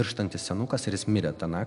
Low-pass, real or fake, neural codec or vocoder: 10.8 kHz; real; none